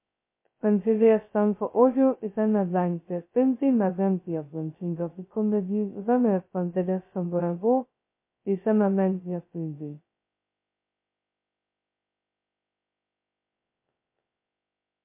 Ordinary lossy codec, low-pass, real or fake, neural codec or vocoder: MP3, 24 kbps; 3.6 kHz; fake; codec, 16 kHz, 0.2 kbps, FocalCodec